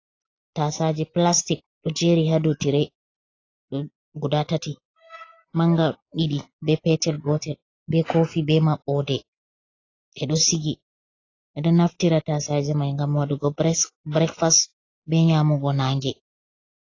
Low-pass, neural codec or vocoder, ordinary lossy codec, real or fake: 7.2 kHz; none; AAC, 32 kbps; real